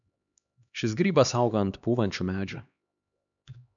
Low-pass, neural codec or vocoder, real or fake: 7.2 kHz; codec, 16 kHz, 2 kbps, X-Codec, HuBERT features, trained on LibriSpeech; fake